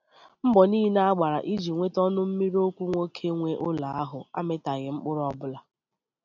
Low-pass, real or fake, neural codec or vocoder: 7.2 kHz; real; none